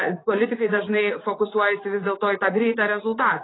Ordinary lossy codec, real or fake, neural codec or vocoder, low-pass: AAC, 16 kbps; fake; vocoder, 44.1 kHz, 80 mel bands, Vocos; 7.2 kHz